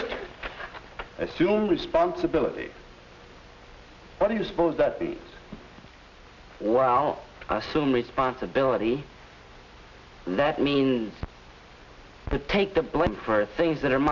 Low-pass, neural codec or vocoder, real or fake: 7.2 kHz; none; real